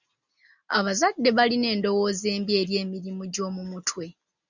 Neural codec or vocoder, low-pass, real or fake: none; 7.2 kHz; real